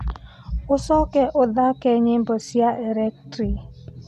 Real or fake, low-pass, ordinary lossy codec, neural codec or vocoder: real; 14.4 kHz; none; none